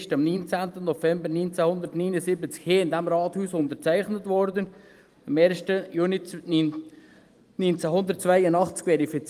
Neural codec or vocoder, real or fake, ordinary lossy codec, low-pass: vocoder, 44.1 kHz, 128 mel bands every 512 samples, BigVGAN v2; fake; Opus, 32 kbps; 14.4 kHz